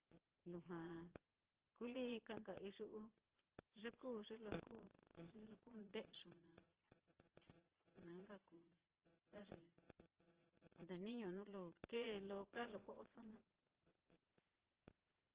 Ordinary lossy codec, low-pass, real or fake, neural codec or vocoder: Opus, 16 kbps; 3.6 kHz; fake; vocoder, 22.05 kHz, 80 mel bands, Vocos